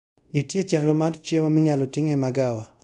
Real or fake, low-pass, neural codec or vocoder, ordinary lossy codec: fake; 10.8 kHz; codec, 24 kHz, 0.5 kbps, DualCodec; MP3, 64 kbps